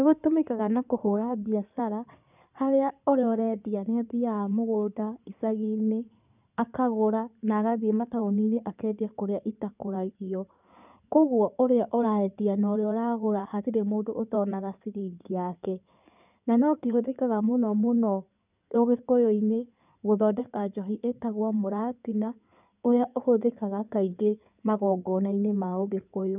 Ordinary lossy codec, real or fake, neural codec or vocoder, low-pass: none; fake; codec, 16 kHz in and 24 kHz out, 2.2 kbps, FireRedTTS-2 codec; 3.6 kHz